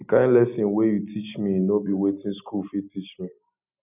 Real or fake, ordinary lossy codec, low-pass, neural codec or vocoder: real; none; 3.6 kHz; none